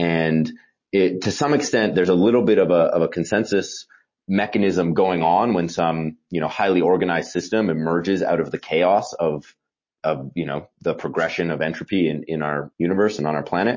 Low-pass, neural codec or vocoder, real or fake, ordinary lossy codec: 7.2 kHz; none; real; MP3, 32 kbps